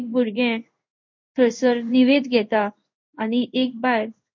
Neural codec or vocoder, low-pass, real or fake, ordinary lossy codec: codec, 16 kHz in and 24 kHz out, 1 kbps, XY-Tokenizer; 7.2 kHz; fake; MP3, 48 kbps